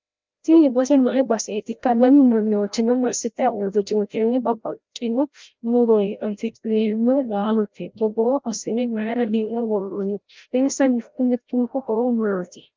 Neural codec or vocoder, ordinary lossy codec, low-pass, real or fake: codec, 16 kHz, 0.5 kbps, FreqCodec, larger model; Opus, 32 kbps; 7.2 kHz; fake